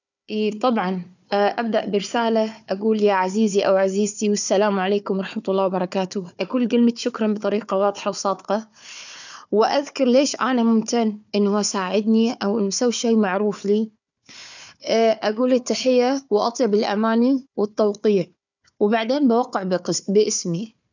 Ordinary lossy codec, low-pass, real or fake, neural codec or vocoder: none; 7.2 kHz; fake; codec, 16 kHz, 4 kbps, FunCodec, trained on Chinese and English, 50 frames a second